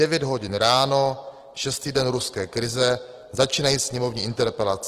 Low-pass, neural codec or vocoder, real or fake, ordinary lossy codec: 14.4 kHz; none; real; Opus, 16 kbps